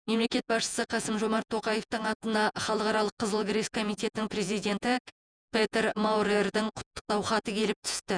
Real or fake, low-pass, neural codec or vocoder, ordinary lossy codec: fake; 9.9 kHz; vocoder, 48 kHz, 128 mel bands, Vocos; none